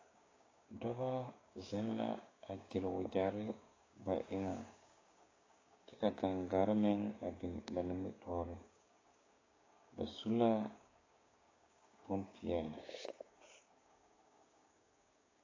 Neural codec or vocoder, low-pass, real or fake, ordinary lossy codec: codec, 16 kHz, 6 kbps, DAC; 7.2 kHz; fake; AAC, 32 kbps